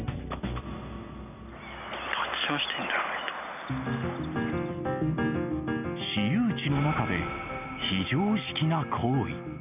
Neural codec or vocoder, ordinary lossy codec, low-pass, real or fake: none; none; 3.6 kHz; real